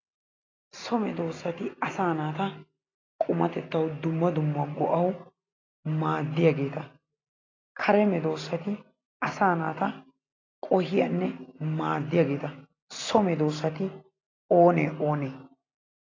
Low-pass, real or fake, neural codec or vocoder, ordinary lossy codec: 7.2 kHz; real; none; AAC, 32 kbps